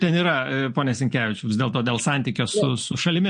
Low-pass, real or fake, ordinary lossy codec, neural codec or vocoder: 9.9 kHz; real; MP3, 48 kbps; none